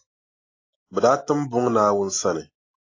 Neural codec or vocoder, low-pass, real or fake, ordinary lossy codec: none; 7.2 kHz; real; AAC, 32 kbps